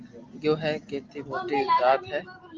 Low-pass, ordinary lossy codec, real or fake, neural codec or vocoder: 7.2 kHz; Opus, 32 kbps; real; none